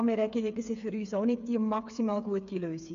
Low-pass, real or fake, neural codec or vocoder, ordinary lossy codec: 7.2 kHz; fake; codec, 16 kHz, 8 kbps, FreqCodec, smaller model; AAC, 96 kbps